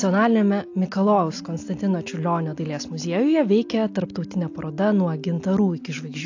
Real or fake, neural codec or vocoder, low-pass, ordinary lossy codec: real; none; 7.2 kHz; AAC, 48 kbps